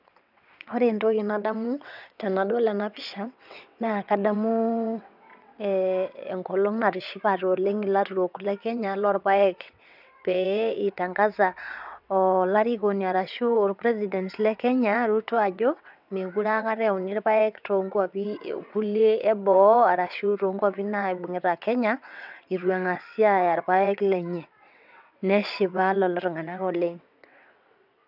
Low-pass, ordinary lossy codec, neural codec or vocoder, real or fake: 5.4 kHz; none; vocoder, 22.05 kHz, 80 mel bands, WaveNeXt; fake